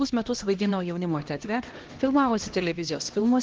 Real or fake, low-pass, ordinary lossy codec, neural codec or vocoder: fake; 7.2 kHz; Opus, 16 kbps; codec, 16 kHz, 1 kbps, X-Codec, HuBERT features, trained on LibriSpeech